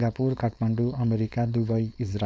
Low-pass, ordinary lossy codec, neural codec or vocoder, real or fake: none; none; codec, 16 kHz, 4.8 kbps, FACodec; fake